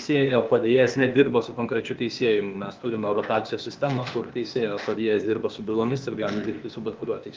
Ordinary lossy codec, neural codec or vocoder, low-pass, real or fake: Opus, 32 kbps; codec, 16 kHz, 0.8 kbps, ZipCodec; 7.2 kHz; fake